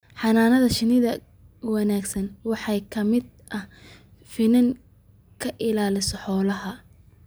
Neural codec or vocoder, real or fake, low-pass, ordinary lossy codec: none; real; none; none